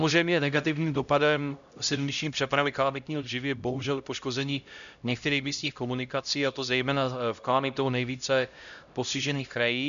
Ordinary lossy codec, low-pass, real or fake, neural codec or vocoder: MP3, 96 kbps; 7.2 kHz; fake; codec, 16 kHz, 0.5 kbps, X-Codec, HuBERT features, trained on LibriSpeech